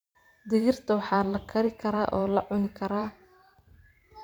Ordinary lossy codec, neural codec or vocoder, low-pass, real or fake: none; vocoder, 44.1 kHz, 128 mel bands every 512 samples, BigVGAN v2; none; fake